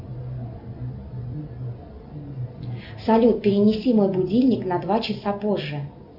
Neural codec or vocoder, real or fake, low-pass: none; real; 5.4 kHz